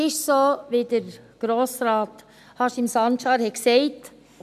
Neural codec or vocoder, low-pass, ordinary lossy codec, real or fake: none; 14.4 kHz; none; real